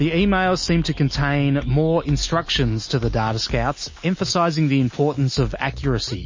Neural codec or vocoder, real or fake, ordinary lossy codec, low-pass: none; real; MP3, 32 kbps; 7.2 kHz